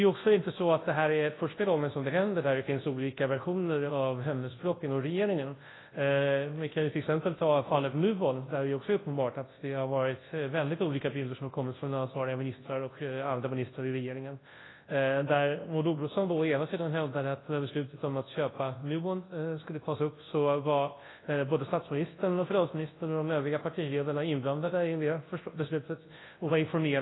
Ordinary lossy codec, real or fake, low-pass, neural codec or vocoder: AAC, 16 kbps; fake; 7.2 kHz; codec, 24 kHz, 0.9 kbps, WavTokenizer, large speech release